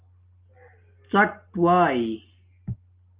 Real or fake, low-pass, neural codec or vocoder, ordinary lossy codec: real; 3.6 kHz; none; Opus, 32 kbps